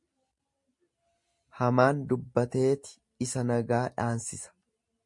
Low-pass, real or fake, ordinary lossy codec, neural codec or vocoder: 10.8 kHz; real; MP3, 48 kbps; none